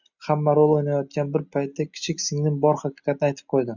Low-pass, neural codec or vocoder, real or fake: 7.2 kHz; none; real